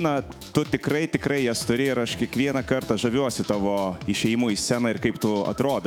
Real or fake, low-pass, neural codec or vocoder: fake; 19.8 kHz; autoencoder, 48 kHz, 128 numbers a frame, DAC-VAE, trained on Japanese speech